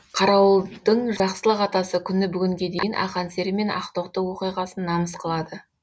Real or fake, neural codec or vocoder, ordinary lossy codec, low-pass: real; none; none; none